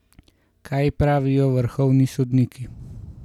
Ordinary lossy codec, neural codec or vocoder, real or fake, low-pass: none; none; real; 19.8 kHz